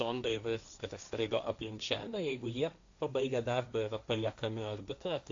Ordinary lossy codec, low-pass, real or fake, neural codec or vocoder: AAC, 64 kbps; 7.2 kHz; fake; codec, 16 kHz, 1.1 kbps, Voila-Tokenizer